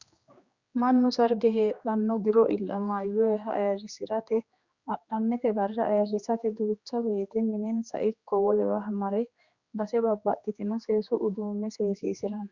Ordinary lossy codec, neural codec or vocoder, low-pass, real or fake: Opus, 64 kbps; codec, 16 kHz, 2 kbps, X-Codec, HuBERT features, trained on general audio; 7.2 kHz; fake